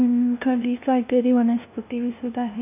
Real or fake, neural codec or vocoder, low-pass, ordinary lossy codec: fake; codec, 16 kHz, 0.5 kbps, FunCodec, trained on LibriTTS, 25 frames a second; 3.6 kHz; none